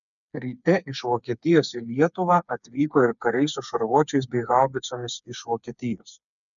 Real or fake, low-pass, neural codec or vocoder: fake; 7.2 kHz; codec, 16 kHz, 4 kbps, FreqCodec, smaller model